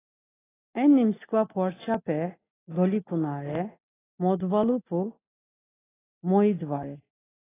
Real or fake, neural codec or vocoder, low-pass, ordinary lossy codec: real; none; 3.6 kHz; AAC, 16 kbps